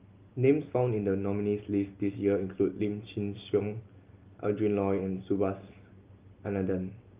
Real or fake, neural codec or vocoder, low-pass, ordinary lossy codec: real; none; 3.6 kHz; Opus, 32 kbps